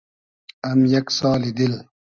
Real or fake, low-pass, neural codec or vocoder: real; 7.2 kHz; none